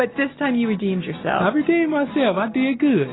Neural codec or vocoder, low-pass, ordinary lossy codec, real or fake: none; 7.2 kHz; AAC, 16 kbps; real